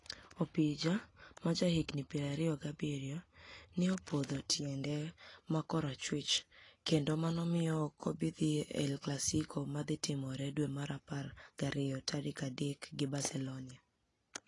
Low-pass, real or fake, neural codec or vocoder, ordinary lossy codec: 10.8 kHz; real; none; AAC, 32 kbps